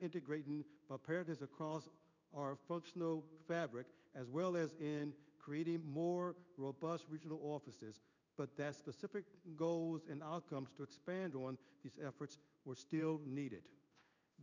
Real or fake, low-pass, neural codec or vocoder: fake; 7.2 kHz; codec, 16 kHz in and 24 kHz out, 1 kbps, XY-Tokenizer